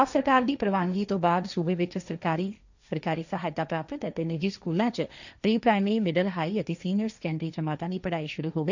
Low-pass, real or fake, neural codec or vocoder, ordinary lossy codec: 7.2 kHz; fake; codec, 16 kHz, 1.1 kbps, Voila-Tokenizer; none